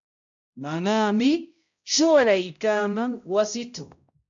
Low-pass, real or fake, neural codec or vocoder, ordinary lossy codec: 7.2 kHz; fake; codec, 16 kHz, 0.5 kbps, X-Codec, HuBERT features, trained on balanced general audio; AAC, 64 kbps